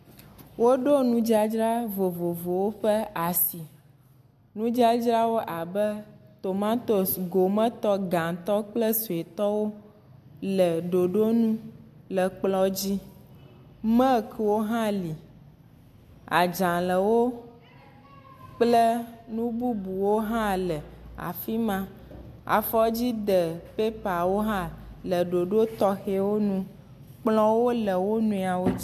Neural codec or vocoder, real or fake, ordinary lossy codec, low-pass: none; real; AAC, 96 kbps; 14.4 kHz